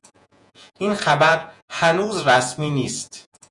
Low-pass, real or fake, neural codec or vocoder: 10.8 kHz; fake; vocoder, 48 kHz, 128 mel bands, Vocos